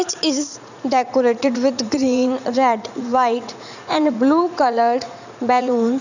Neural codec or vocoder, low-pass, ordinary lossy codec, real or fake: vocoder, 44.1 kHz, 80 mel bands, Vocos; 7.2 kHz; none; fake